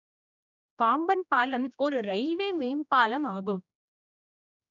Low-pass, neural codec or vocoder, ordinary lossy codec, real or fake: 7.2 kHz; codec, 16 kHz, 1 kbps, X-Codec, HuBERT features, trained on general audio; none; fake